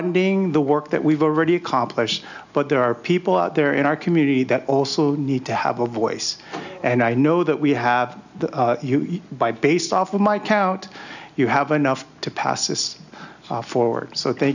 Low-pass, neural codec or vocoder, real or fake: 7.2 kHz; none; real